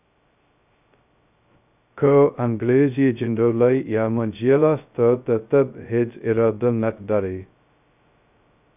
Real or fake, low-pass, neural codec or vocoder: fake; 3.6 kHz; codec, 16 kHz, 0.2 kbps, FocalCodec